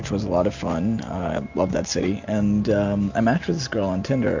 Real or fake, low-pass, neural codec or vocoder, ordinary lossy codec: real; 7.2 kHz; none; MP3, 64 kbps